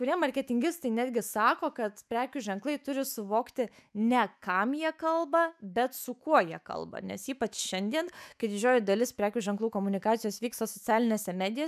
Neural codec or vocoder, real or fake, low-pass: autoencoder, 48 kHz, 128 numbers a frame, DAC-VAE, trained on Japanese speech; fake; 14.4 kHz